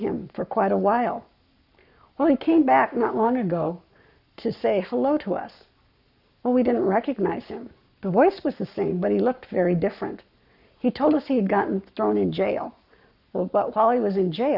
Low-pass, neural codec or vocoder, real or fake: 5.4 kHz; codec, 44.1 kHz, 7.8 kbps, Pupu-Codec; fake